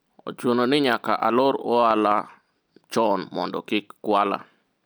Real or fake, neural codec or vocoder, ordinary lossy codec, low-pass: real; none; none; none